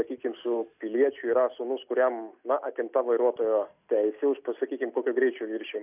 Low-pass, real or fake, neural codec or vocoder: 3.6 kHz; real; none